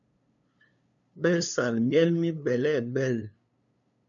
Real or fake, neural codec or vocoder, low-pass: fake; codec, 16 kHz, 2 kbps, FunCodec, trained on LibriTTS, 25 frames a second; 7.2 kHz